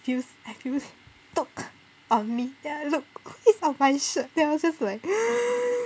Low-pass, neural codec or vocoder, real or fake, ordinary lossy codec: none; none; real; none